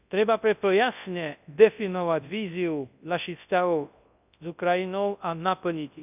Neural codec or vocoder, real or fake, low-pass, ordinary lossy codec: codec, 24 kHz, 0.9 kbps, WavTokenizer, large speech release; fake; 3.6 kHz; none